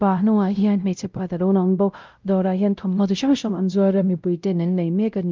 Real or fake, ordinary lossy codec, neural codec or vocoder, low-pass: fake; Opus, 32 kbps; codec, 16 kHz, 0.5 kbps, X-Codec, WavLM features, trained on Multilingual LibriSpeech; 7.2 kHz